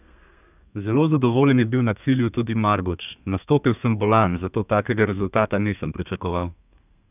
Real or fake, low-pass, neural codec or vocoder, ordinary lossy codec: fake; 3.6 kHz; codec, 32 kHz, 1.9 kbps, SNAC; none